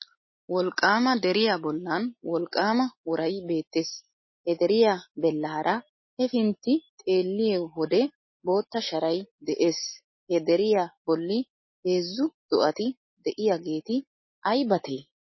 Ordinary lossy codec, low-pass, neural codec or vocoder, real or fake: MP3, 24 kbps; 7.2 kHz; none; real